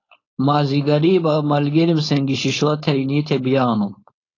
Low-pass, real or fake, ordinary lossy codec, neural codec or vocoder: 7.2 kHz; fake; AAC, 32 kbps; codec, 16 kHz, 4.8 kbps, FACodec